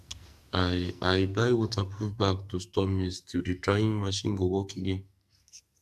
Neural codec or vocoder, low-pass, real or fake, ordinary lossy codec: codec, 44.1 kHz, 2.6 kbps, SNAC; 14.4 kHz; fake; AAC, 96 kbps